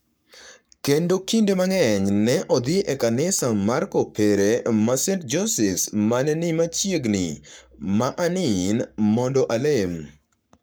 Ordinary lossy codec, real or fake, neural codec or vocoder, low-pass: none; fake; codec, 44.1 kHz, 7.8 kbps, Pupu-Codec; none